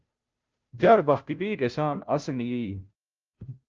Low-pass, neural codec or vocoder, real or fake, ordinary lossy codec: 7.2 kHz; codec, 16 kHz, 0.5 kbps, FunCodec, trained on Chinese and English, 25 frames a second; fake; Opus, 24 kbps